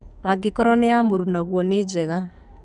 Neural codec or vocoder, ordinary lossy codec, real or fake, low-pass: codec, 44.1 kHz, 2.6 kbps, SNAC; none; fake; 10.8 kHz